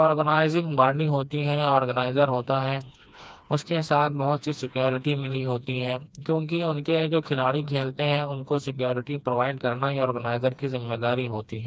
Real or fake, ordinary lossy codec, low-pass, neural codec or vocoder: fake; none; none; codec, 16 kHz, 2 kbps, FreqCodec, smaller model